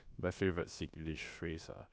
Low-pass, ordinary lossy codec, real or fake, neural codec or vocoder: none; none; fake; codec, 16 kHz, about 1 kbps, DyCAST, with the encoder's durations